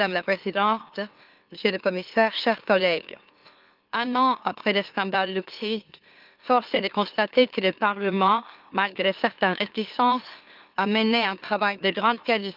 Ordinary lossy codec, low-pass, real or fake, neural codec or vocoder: Opus, 24 kbps; 5.4 kHz; fake; autoencoder, 44.1 kHz, a latent of 192 numbers a frame, MeloTTS